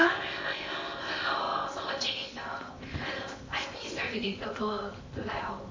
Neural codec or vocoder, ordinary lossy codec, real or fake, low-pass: codec, 16 kHz in and 24 kHz out, 0.8 kbps, FocalCodec, streaming, 65536 codes; MP3, 32 kbps; fake; 7.2 kHz